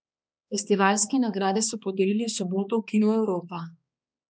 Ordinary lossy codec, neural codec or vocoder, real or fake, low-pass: none; codec, 16 kHz, 2 kbps, X-Codec, HuBERT features, trained on balanced general audio; fake; none